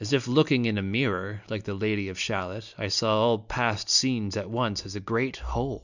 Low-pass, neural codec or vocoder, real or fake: 7.2 kHz; none; real